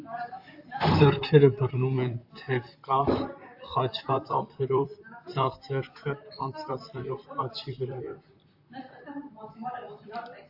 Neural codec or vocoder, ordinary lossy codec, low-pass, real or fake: vocoder, 44.1 kHz, 128 mel bands, Pupu-Vocoder; AAC, 48 kbps; 5.4 kHz; fake